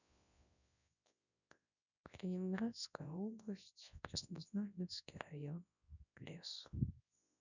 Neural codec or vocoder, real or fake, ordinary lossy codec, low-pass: codec, 24 kHz, 0.9 kbps, WavTokenizer, large speech release; fake; none; 7.2 kHz